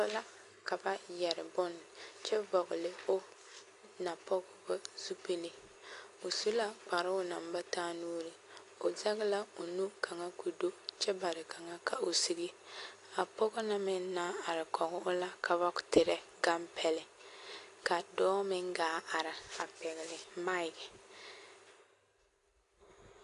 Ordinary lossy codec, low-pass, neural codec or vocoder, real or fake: AAC, 48 kbps; 10.8 kHz; none; real